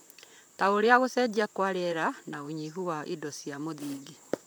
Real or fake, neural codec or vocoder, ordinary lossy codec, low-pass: fake; vocoder, 44.1 kHz, 128 mel bands, Pupu-Vocoder; none; none